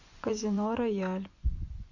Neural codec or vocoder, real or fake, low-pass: vocoder, 24 kHz, 100 mel bands, Vocos; fake; 7.2 kHz